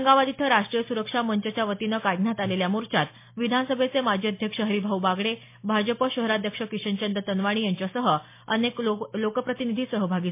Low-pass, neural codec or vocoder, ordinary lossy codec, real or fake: 3.6 kHz; none; MP3, 24 kbps; real